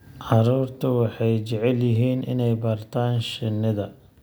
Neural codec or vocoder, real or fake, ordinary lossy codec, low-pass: none; real; none; none